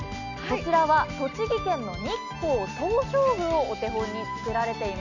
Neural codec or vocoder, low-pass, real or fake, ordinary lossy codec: none; 7.2 kHz; real; none